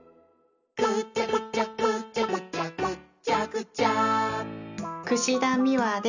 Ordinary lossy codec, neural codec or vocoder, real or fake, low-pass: none; none; real; 7.2 kHz